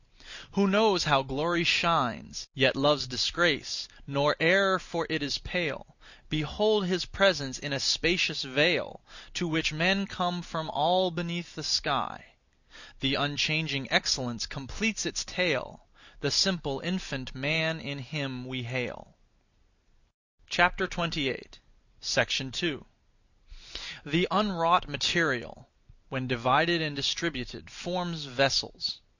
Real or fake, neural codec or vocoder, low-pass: real; none; 7.2 kHz